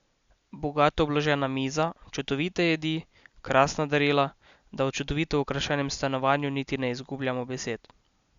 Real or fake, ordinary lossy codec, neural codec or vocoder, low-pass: real; Opus, 64 kbps; none; 7.2 kHz